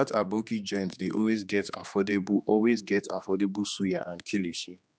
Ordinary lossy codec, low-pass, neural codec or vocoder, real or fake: none; none; codec, 16 kHz, 2 kbps, X-Codec, HuBERT features, trained on general audio; fake